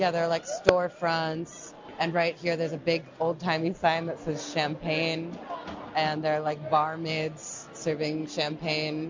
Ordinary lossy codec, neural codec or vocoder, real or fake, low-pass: MP3, 64 kbps; none; real; 7.2 kHz